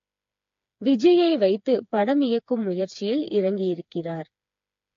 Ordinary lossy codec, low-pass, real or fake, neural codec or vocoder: AAC, 48 kbps; 7.2 kHz; fake; codec, 16 kHz, 4 kbps, FreqCodec, smaller model